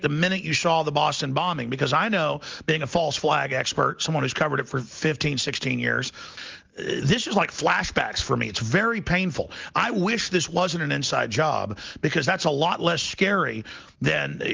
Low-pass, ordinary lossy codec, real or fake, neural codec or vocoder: 7.2 kHz; Opus, 32 kbps; real; none